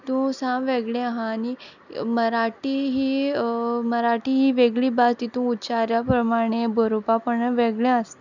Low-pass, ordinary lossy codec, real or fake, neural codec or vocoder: 7.2 kHz; none; real; none